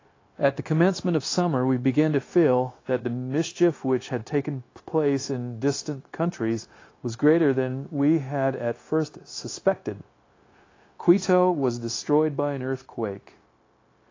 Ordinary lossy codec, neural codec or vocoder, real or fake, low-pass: AAC, 32 kbps; codec, 16 kHz, 0.9 kbps, LongCat-Audio-Codec; fake; 7.2 kHz